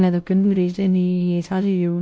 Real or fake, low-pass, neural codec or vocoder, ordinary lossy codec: fake; none; codec, 16 kHz, 1 kbps, X-Codec, WavLM features, trained on Multilingual LibriSpeech; none